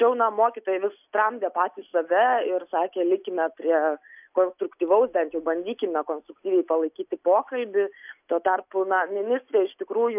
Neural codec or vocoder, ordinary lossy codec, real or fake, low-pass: none; AAC, 32 kbps; real; 3.6 kHz